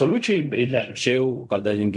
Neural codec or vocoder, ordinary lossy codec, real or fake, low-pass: codec, 16 kHz in and 24 kHz out, 0.4 kbps, LongCat-Audio-Codec, fine tuned four codebook decoder; MP3, 64 kbps; fake; 10.8 kHz